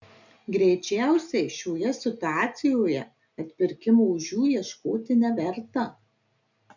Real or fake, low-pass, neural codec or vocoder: real; 7.2 kHz; none